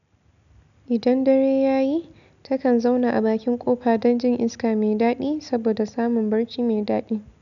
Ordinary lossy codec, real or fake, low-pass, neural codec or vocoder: none; real; 7.2 kHz; none